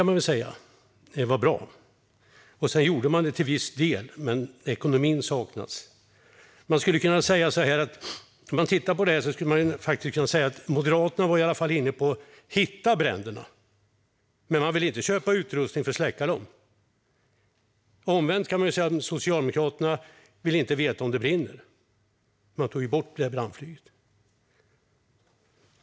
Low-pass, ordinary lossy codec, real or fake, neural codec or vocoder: none; none; real; none